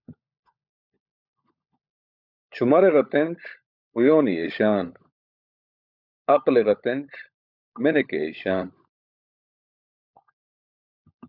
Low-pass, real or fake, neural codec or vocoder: 5.4 kHz; fake; codec, 16 kHz, 16 kbps, FunCodec, trained on LibriTTS, 50 frames a second